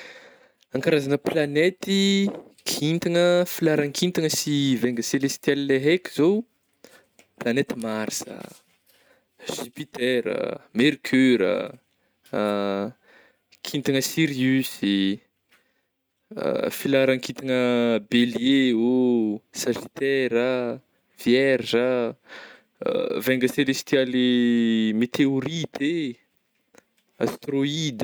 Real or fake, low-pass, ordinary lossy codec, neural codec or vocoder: real; none; none; none